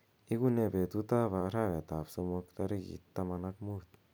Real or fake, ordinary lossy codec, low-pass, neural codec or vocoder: real; none; none; none